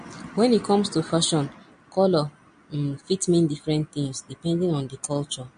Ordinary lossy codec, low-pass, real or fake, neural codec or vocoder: MP3, 48 kbps; 9.9 kHz; real; none